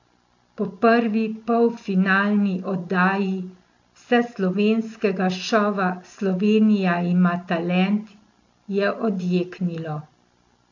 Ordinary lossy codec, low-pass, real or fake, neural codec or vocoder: AAC, 48 kbps; 7.2 kHz; real; none